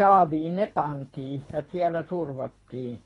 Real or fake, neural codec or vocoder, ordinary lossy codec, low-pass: fake; codec, 24 kHz, 3 kbps, HILCodec; AAC, 32 kbps; 10.8 kHz